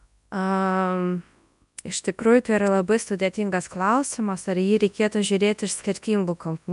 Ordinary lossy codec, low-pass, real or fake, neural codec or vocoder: MP3, 96 kbps; 10.8 kHz; fake; codec, 24 kHz, 0.9 kbps, WavTokenizer, large speech release